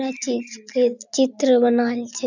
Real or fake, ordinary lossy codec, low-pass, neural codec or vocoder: real; none; 7.2 kHz; none